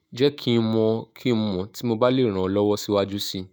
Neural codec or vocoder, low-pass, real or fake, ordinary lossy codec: autoencoder, 48 kHz, 128 numbers a frame, DAC-VAE, trained on Japanese speech; none; fake; none